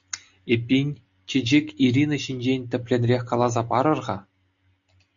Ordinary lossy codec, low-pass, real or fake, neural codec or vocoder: MP3, 64 kbps; 7.2 kHz; real; none